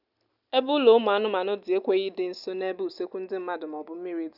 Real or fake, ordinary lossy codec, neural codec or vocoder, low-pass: real; none; none; 5.4 kHz